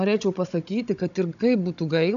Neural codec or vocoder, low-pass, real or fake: codec, 16 kHz, 16 kbps, FunCodec, trained on Chinese and English, 50 frames a second; 7.2 kHz; fake